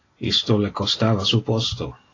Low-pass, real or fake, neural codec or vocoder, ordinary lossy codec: 7.2 kHz; real; none; AAC, 32 kbps